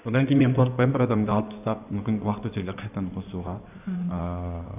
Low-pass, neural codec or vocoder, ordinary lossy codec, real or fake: 3.6 kHz; codec, 16 kHz in and 24 kHz out, 2.2 kbps, FireRedTTS-2 codec; none; fake